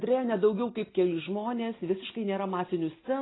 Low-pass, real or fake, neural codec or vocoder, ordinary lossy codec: 7.2 kHz; real; none; AAC, 16 kbps